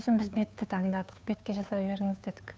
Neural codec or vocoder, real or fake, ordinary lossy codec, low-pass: codec, 16 kHz, 2 kbps, FunCodec, trained on Chinese and English, 25 frames a second; fake; none; none